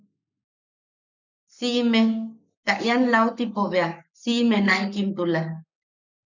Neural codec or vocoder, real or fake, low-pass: codec, 44.1 kHz, 7.8 kbps, Pupu-Codec; fake; 7.2 kHz